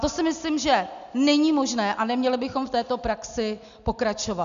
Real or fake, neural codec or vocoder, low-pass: real; none; 7.2 kHz